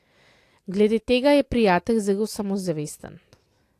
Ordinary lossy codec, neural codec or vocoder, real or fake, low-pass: AAC, 64 kbps; none; real; 14.4 kHz